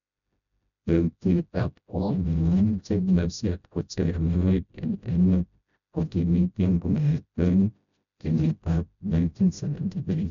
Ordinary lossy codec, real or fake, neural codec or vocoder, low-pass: none; fake; codec, 16 kHz, 0.5 kbps, FreqCodec, smaller model; 7.2 kHz